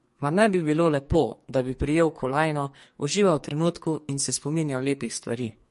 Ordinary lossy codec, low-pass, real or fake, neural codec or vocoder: MP3, 48 kbps; 14.4 kHz; fake; codec, 44.1 kHz, 2.6 kbps, SNAC